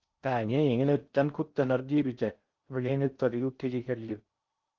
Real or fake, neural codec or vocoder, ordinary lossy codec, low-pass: fake; codec, 16 kHz in and 24 kHz out, 0.6 kbps, FocalCodec, streaming, 4096 codes; Opus, 32 kbps; 7.2 kHz